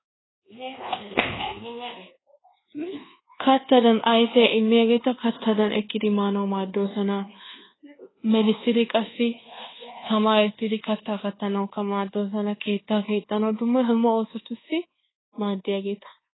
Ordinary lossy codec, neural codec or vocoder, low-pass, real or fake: AAC, 16 kbps; codec, 24 kHz, 1.2 kbps, DualCodec; 7.2 kHz; fake